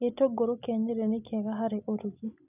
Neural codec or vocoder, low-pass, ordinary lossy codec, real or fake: none; 3.6 kHz; none; real